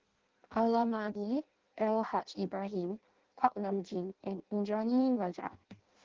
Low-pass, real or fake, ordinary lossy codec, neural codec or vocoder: 7.2 kHz; fake; Opus, 16 kbps; codec, 16 kHz in and 24 kHz out, 0.6 kbps, FireRedTTS-2 codec